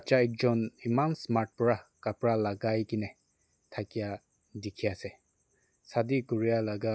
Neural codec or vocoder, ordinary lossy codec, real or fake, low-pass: none; none; real; none